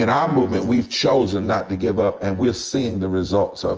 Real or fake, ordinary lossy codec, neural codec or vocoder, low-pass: fake; Opus, 24 kbps; vocoder, 24 kHz, 100 mel bands, Vocos; 7.2 kHz